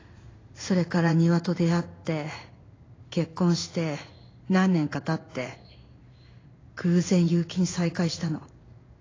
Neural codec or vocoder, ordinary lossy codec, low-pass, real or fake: codec, 16 kHz in and 24 kHz out, 1 kbps, XY-Tokenizer; AAC, 32 kbps; 7.2 kHz; fake